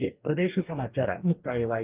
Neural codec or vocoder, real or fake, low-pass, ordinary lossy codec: codec, 44.1 kHz, 2.6 kbps, DAC; fake; 3.6 kHz; Opus, 32 kbps